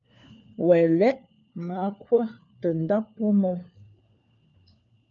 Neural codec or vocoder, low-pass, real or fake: codec, 16 kHz, 4 kbps, FunCodec, trained on LibriTTS, 50 frames a second; 7.2 kHz; fake